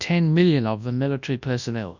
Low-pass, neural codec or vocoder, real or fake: 7.2 kHz; codec, 24 kHz, 0.9 kbps, WavTokenizer, large speech release; fake